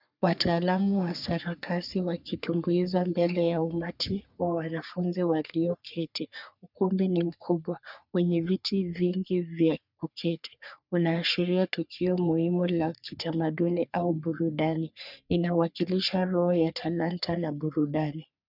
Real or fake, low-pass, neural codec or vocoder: fake; 5.4 kHz; codec, 44.1 kHz, 3.4 kbps, Pupu-Codec